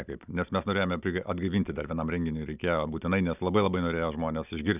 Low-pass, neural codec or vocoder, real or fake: 3.6 kHz; none; real